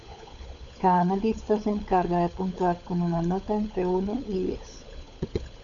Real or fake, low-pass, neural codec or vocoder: fake; 7.2 kHz; codec, 16 kHz, 16 kbps, FunCodec, trained on LibriTTS, 50 frames a second